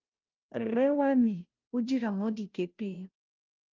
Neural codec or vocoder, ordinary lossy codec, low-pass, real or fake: codec, 16 kHz, 0.5 kbps, FunCodec, trained on Chinese and English, 25 frames a second; Opus, 24 kbps; 7.2 kHz; fake